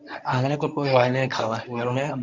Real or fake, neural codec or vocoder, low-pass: fake; codec, 24 kHz, 0.9 kbps, WavTokenizer, medium speech release version 1; 7.2 kHz